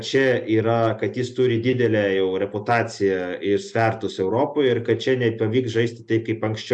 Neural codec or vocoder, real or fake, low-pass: none; real; 10.8 kHz